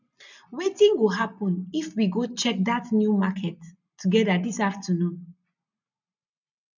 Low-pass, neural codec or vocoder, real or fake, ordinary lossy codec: 7.2 kHz; none; real; none